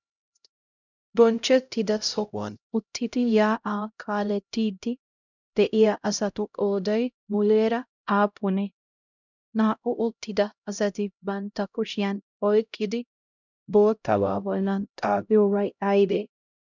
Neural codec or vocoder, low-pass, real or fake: codec, 16 kHz, 0.5 kbps, X-Codec, HuBERT features, trained on LibriSpeech; 7.2 kHz; fake